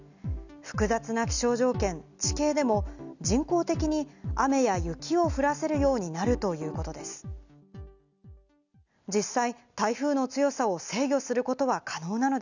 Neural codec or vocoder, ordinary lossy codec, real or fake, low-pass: none; none; real; 7.2 kHz